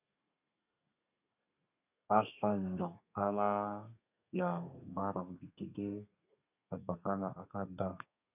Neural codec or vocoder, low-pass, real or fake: codec, 32 kHz, 1.9 kbps, SNAC; 3.6 kHz; fake